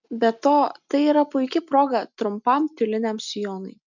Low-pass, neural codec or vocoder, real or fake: 7.2 kHz; none; real